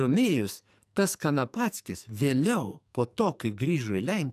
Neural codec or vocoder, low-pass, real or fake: codec, 44.1 kHz, 2.6 kbps, SNAC; 14.4 kHz; fake